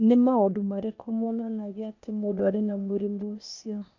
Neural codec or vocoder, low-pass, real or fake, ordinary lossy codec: codec, 16 kHz, 0.8 kbps, ZipCodec; 7.2 kHz; fake; none